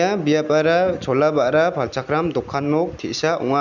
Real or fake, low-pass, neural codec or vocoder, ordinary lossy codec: real; 7.2 kHz; none; none